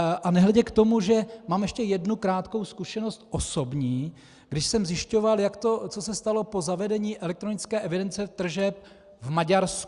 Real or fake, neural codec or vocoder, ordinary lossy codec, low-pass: real; none; Opus, 64 kbps; 10.8 kHz